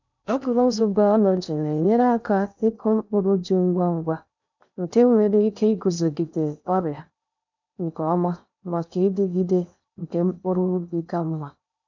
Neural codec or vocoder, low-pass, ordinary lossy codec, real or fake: codec, 16 kHz in and 24 kHz out, 0.6 kbps, FocalCodec, streaming, 2048 codes; 7.2 kHz; none; fake